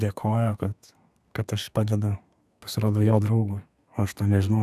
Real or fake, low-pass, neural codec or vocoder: fake; 14.4 kHz; codec, 32 kHz, 1.9 kbps, SNAC